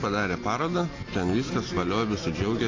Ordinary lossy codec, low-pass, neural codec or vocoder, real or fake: AAC, 32 kbps; 7.2 kHz; none; real